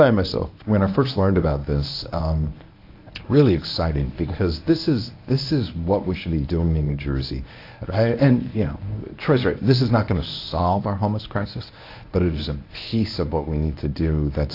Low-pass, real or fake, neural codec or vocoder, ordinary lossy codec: 5.4 kHz; fake; codec, 24 kHz, 0.9 kbps, WavTokenizer, medium speech release version 1; AAC, 32 kbps